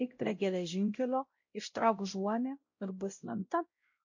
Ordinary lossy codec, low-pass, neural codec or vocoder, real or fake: MP3, 48 kbps; 7.2 kHz; codec, 16 kHz, 0.5 kbps, X-Codec, WavLM features, trained on Multilingual LibriSpeech; fake